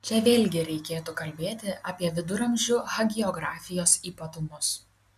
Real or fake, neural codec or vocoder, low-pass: real; none; 14.4 kHz